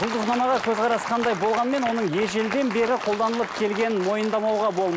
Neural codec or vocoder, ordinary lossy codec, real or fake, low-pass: none; none; real; none